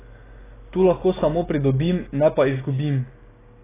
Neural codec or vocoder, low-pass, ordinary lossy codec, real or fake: none; 3.6 kHz; AAC, 16 kbps; real